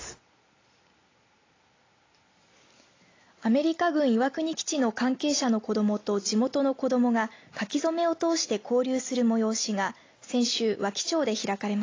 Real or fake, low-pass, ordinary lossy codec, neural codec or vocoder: real; 7.2 kHz; AAC, 32 kbps; none